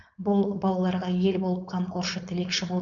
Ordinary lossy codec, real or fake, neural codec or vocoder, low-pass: none; fake; codec, 16 kHz, 4.8 kbps, FACodec; 7.2 kHz